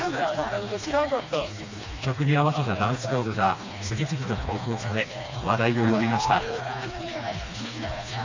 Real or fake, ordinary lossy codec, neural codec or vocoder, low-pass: fake; AAC, 48 kbps; codec, 16 kHz, 2 kbps, FreqCodec, smaller model; 7.2 kHz